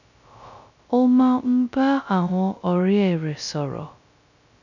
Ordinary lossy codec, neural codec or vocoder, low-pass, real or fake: none; codec, 16 kHz, 0.2 kbps, FocalCodec; 7.2 kHz; fake